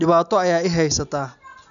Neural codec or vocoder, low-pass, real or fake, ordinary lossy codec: none; 7.2 kHz; real; none